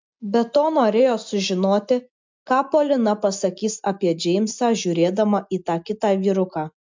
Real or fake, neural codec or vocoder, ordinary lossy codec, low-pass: real; none; MP3, 64 kbps; 7.2 kHz